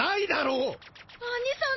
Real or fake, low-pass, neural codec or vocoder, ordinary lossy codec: real; 7.2 kHz; none; MP3, 24 kbps